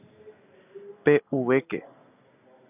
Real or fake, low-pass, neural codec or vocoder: real; 3.6 kHz; none